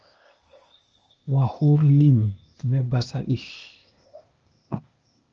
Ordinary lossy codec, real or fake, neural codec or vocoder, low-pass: Opus, 24 kbps; fake; codec, 16 kHz, 0.8 kbps, ZipCodec; 7.2 kHz